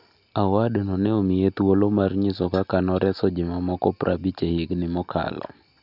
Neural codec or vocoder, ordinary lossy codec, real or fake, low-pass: none; none; real; 5.4 kHz